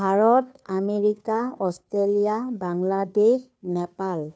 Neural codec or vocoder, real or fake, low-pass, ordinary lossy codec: codec, 16 kHz, 2 kbps, FunCodec, trained on Chinese and English, 25 frames a second; fake; none; none